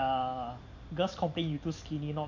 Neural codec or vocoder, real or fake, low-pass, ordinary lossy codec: none; real; 7.2 kHz; MP3, 48 kbps